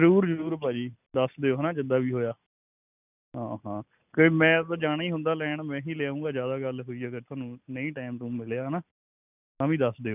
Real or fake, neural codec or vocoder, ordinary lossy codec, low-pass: real; none; none; 3.6 kHz